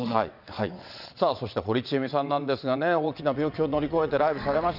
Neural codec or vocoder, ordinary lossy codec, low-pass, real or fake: vocoder, 22.05 kHz, 80 mel bands, WaveNeXt; none; 5.4 kHz; fake